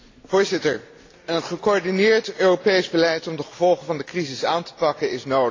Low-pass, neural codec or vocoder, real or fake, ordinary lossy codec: 7.2 kHz; none; real; AAC, 32 kbps